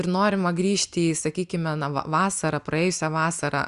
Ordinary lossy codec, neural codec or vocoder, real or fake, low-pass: Opus, 64 kbps; none; real; 10.8 kHz